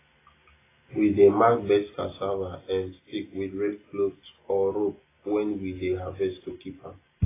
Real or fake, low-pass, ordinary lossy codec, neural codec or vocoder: real; 3.6 kHz; AAC, 16 kbps; none